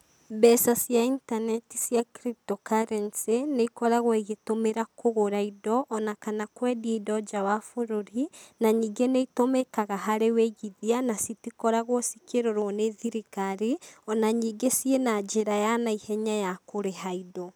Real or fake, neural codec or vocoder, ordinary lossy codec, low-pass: real; none; none; none